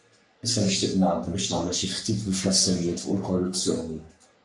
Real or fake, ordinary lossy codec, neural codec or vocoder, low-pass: fake; MP3, 64 kbps; codec, 44.1 kHz, 3.4 kbps, Pupu-Codec; 10.8 kHz